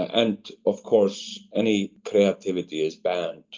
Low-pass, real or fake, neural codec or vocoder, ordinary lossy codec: 7.2 kHz; real; none; Opus, 24 kbps